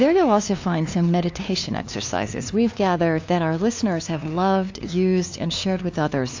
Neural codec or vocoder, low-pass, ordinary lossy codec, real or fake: codec, 16 kHz, 2 kbps, FunCodec, trained on LibriTTS, 25 frames a second; 7.2 kHz; AAC, 48 kbps; fake